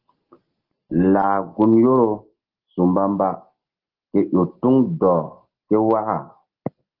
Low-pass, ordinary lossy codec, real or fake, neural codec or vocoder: 5.4 kHz; Opus, 16 kbps; real; none